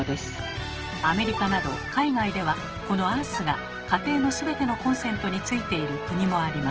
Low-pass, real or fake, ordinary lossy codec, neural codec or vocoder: 7.2 kHz; real; Opus, 16 kbps; none